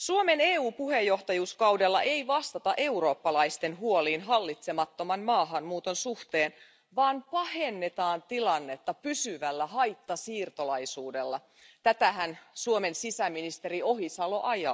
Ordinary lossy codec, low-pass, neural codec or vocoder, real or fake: none; none; none; real